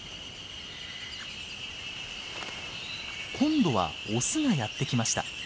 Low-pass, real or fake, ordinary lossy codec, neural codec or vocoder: none; real; none; none